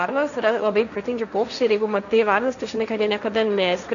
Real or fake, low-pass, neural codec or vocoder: fake; 7.2 kHz; codec, 16 kHz, 1.1 kbps, Voila-Tokenizer